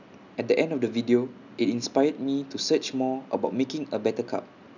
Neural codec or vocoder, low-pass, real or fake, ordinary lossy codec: none; 7.2 kHz; real; none